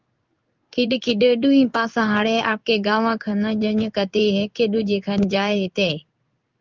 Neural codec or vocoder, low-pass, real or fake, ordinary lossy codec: codec, 16 kHz in and 24 kHz out, 1 kbps, XY-Tokenizer; 7.2 kHz; fake; Opus, 24 kbps